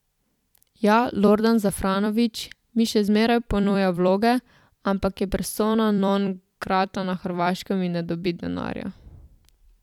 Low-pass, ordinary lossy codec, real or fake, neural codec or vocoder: 19.8 kHz; none; fake; vocoder, 44.1 kHz, 128 mel bands every 256 samples, BigVGAN v2